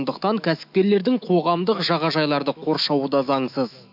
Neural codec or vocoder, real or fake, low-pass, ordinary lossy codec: autoencoder, 48 kHz, 128 numbers a frame, DAC-VAE, trained on Japanese speech; fake; 5.4 kHz; MP3, 48 kbps